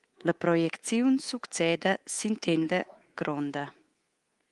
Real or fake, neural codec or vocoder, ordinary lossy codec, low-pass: fake; codec, 24 kHz, 3.1 kbps, DualCodec; Opus, 24 kbps; 10.8 kHz